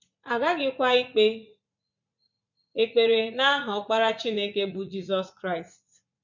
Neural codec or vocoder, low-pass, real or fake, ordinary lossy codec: vocoder, 44.1 kHz, 80 mel bands, Vocos; 7.2 kHz; fake; none